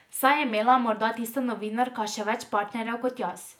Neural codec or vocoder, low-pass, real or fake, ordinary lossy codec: vocoder, 48 kHz, 128 mel bands, Vocos; 19.8 kHz; fake; none